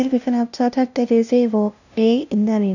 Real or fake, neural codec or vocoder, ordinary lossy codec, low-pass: fake; codec, 16 kHz, 0.5 kbps, FunCodec, trained on LibriTTS, 25 frames a second; none; 7.2 kHz